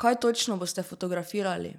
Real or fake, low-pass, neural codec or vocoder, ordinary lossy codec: real; 19.8 kHz; none; none